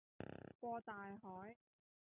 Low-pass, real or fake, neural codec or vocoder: 3.6 kHz; real; none